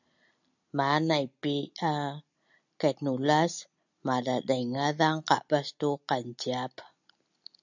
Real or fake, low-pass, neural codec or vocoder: real; 7.2 kHz; none